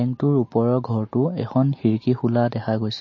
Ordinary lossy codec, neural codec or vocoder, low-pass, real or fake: MP3, 32 kbps; none; 7.2 kHz; real